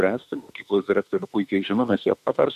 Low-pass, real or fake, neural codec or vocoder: 14.4 kHz; fake; autoencoder, 48 kHz, 32 numbers a frame, DAC-VAE, trained on Japanese speech